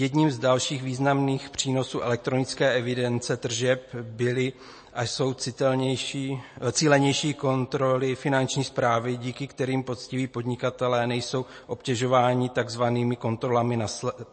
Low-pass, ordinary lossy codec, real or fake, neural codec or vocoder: 9.9 kHz; MP3, 32 kbps; real; none